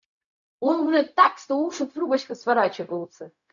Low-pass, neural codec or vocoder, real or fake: 7.2 kHz; codec, 16 kHz, 0.4 kbps, LongCat-Audio-Codec; fake